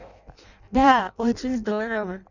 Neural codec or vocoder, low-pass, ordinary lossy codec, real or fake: codec, 16 kHz in and 24 kHz out, 0.6 kbps, FireRedTTS-2 codec; 7.2 kHz; none; fake